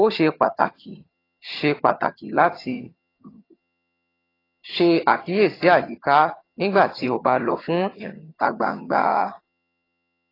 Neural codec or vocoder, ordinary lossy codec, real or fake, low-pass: vocoder, 22.05 kHz, 80 mel bands, HiFi-GAN; AAC, 24 kbps; fake; 5.4 kHz